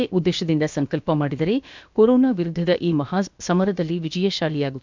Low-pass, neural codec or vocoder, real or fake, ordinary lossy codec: 7.2 kHz; codec, 16 kHz, about 1 kbps, DyCAST, with the encoder's durations; fake; MP3, 64 kbps